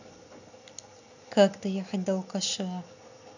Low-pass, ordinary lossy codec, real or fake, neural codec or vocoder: 7.2 kHz; none; real; none